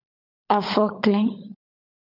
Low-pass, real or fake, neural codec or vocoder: 5.4 kHz; fake; codec, 16 kHz, 16 kbps, FunCodec, trained on LibriTTS, 50 frames a second